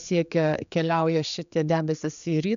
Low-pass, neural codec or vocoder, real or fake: 7.2 kHz; codec, 16 kHz, 4 kbps, X-Codec, HuBERT features, trained on general audio; fake